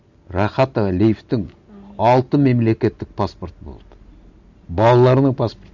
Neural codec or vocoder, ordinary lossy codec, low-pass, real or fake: none; none; 7.2 kHz; real